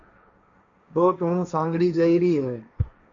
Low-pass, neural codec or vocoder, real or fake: 7.2 kHz; codec, 16 kHz, 1.1 kbps, Voila-Tokenizer; fake